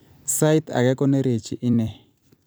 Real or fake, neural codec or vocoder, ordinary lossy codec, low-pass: fake; vocoder, 44.1 kHz, 128 mel bands every 256 samples, BigVGAN v2; none; none